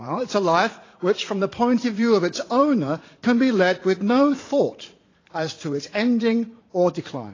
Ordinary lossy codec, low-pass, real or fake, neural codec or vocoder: AAC, 32 kbps; 7.2 kHz; fake; vocoder, 44.1 kHz, 80 mel bands, Vocos